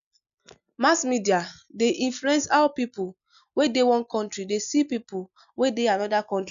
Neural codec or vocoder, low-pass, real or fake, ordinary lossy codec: none; 7.2 kHz; real; none